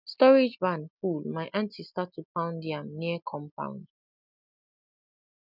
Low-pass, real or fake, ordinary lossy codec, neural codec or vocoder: 5.4 kHz; real; none; none